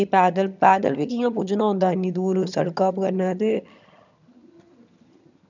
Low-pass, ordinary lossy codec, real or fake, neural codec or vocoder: 7.2 kHz; none; fake; vocoder, 22.05 kHz, 80 mel bands, HiFi-GAN